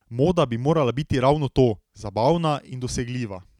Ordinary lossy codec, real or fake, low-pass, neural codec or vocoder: none; real; 19.8 kHz; none